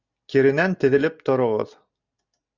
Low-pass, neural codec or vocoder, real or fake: 7.2 kHz; none; real